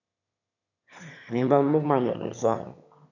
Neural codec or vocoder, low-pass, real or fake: autoencoder, 22.05 kHz, a latent of 192 numbers a frame, VITS, trained on one speaker; 7.2 kHz; fake